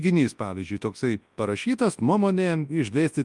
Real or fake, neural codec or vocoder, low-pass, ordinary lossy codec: fake; codec, 24 kHz, 0.9 kbps, WavTokenizer, large speech release; 10.8 kHz; Opus, 32 kbps